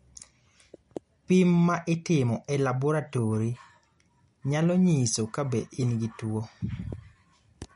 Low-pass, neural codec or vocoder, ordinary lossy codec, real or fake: 10.8 kHz; none; MP3, 48 kbps; real